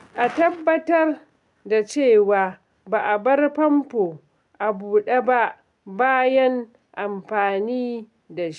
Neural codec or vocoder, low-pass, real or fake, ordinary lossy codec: none; 10.8 kHz; real; none